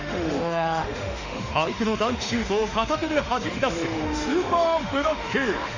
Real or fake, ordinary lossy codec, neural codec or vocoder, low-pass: fake; Opus, 64 kbps; autoencoder, 48 kHz, 32 numbers a frame, DAC-VAE, trained on Japanese speech; 7.2 kHz